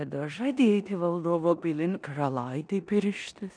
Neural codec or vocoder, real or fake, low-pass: codec, 16 kHz in and 24 kHz out, 0.9 kbps, LongCat-Audio-Codec, four codebook decoder; fake; 9.9 kHz